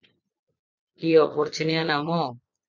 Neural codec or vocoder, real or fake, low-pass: vocoder, 22.05 kHz, 80 mel bands, Vocos; fake; 7.2 kHz